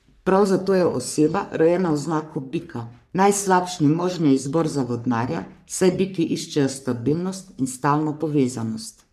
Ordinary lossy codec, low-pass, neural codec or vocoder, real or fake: none; 14.4 kHz; codec, 44.1 kHz, 3.4 kbps, Pupu-Codec; fake